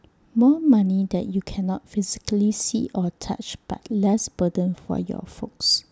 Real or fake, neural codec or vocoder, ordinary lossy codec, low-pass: real; none; none; none